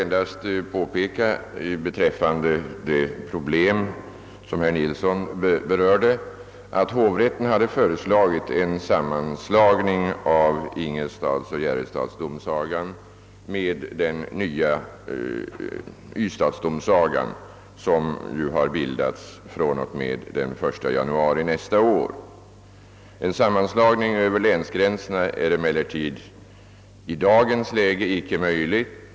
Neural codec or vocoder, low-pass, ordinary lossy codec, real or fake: none; none; none; real